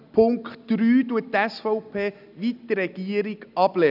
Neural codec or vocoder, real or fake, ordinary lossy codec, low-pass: none; real; none; 5.4 kHz